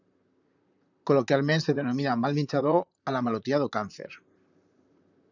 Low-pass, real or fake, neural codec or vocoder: 7.2 kHz; fake; vocoder, 44.1 kHz, 128 mel bands, Pupu-Vocoder